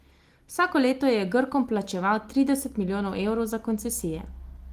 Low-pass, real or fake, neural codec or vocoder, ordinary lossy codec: 14.4 kHz; real; none; Opus, 24 kbps